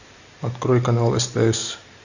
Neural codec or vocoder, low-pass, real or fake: none; 7.2 kHz; real